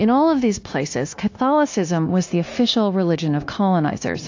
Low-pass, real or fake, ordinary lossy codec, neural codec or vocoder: 7.2 kHz; fake; AAC, 48 kbps; codec, 16 kHz, 0.9 kbps, LongCat-Audio-Codec